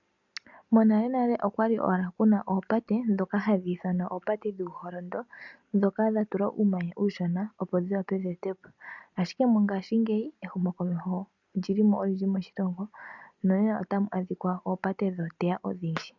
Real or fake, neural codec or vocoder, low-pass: real; none; 7.2 kHz